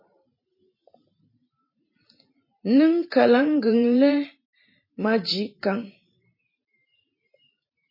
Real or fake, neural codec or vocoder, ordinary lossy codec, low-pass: fake; vocoder, 44.1 kHz, 128 mel bands every 512 samples, BigVGAN v2; MP3, 24 kbps; 5.4 kHz